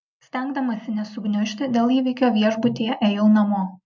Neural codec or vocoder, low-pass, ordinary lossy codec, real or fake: none; 7.2 kHz; MP3, 64 kbps; real